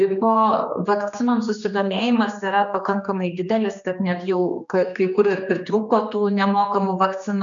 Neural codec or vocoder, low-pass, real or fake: codec, 16 kHz, 2 kbps, X-Codec, HuBERT features, trained on general audio; 7.2 kHz; fake